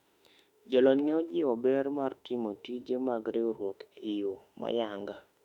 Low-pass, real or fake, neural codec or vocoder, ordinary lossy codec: 19.8 kHz; fake; autoencoder, 48 kHz, 32 numbers a frame, DAC-VAE, trained on Japanese speech; none